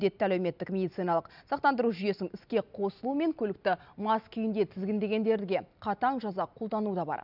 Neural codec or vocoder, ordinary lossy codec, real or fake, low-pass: none; none; real; 5.4 kHz